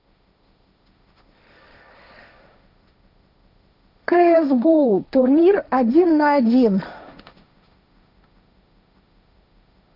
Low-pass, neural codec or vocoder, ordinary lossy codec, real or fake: 5.4 kHz; codec, 16 kHz, 1.1 kbps, Voila-Tokenizer; none; fake